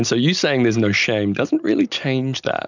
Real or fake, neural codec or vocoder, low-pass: real; none; 7.2 kHz